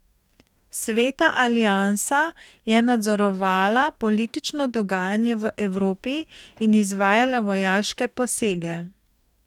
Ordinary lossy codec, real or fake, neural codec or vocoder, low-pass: none; fake; codec, 44.1 kHz, 2.6 kbps, DAC; 19.8 kHz